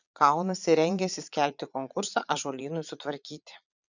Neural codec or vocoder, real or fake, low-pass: vocoder, 22.05 kHz, 80 mel bands, Vocos; fake; 7.2 kHz